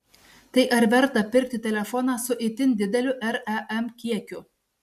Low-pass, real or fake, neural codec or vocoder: 14.4 kHz; real; none